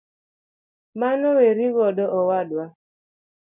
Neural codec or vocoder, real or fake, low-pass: none; real; 3.6 kHz